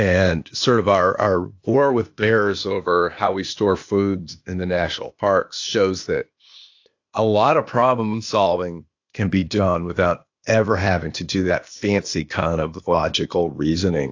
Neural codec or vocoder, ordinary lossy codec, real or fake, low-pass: codec, 16 kHz, 0.8 kbps, ZipCodec; AAC, 48 kbps; fake; 7.2 kHz